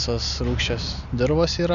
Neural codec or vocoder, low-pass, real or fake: none; 7.2 kHz; real